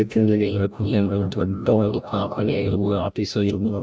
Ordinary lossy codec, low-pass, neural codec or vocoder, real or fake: none; none; codec, 16 kHz, 0.5 kbps, FreqCodec, larger model; fake